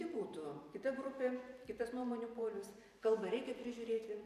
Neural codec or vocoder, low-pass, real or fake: vocoder, 44.1 kHz, 128 mel bands every 512 samples, BigVGAN v2; 14.4 kHz; fake